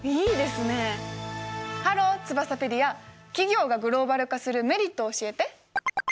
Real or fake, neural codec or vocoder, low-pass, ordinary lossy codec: real; none; none; none